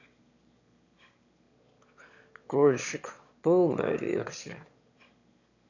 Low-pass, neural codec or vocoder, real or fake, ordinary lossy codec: 7.2 kHz; autoencoder, 22.05 kHz, a latent of 192 numbers a frame, VITS, trained on one speaker; fake; none